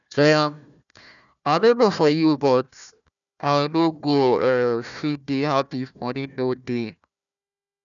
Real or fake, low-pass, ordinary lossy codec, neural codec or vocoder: fake; 7.2 kHz; none; codec, 16 kHz, 1 kbps, FunCodec, trained on Chinese and English, 50 frames a second